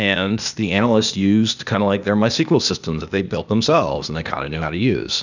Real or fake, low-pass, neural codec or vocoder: fake; 7.2 kHz; codec, 16 kHz, 0.8 kbps, ZipCodec